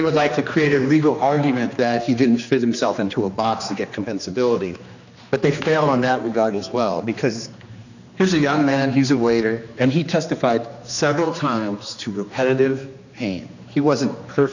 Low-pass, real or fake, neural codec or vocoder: 7.2 kHz; fake; codec, 16 kHz, 2 kbps, X-Codec, HuBERT features, trained on general audio